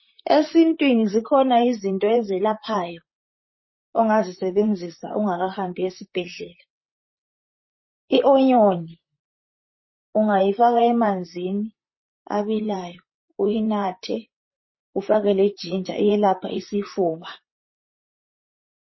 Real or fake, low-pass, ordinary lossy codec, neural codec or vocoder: fake; 7.2 kHz; MP3, 24 kbps; vocoder, 44.1 kHz, 128 mel bands, Pupu-Vocoder